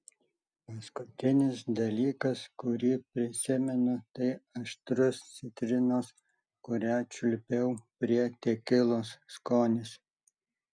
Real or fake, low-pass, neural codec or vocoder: real; 9.9 kHz; none